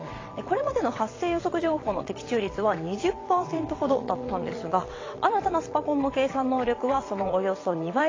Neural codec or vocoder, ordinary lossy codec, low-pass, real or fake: vocoder, 22.05 kHz, 80 mel bands, WaveNeXt; AAC, 32 kbps; 7.2 kHz; fake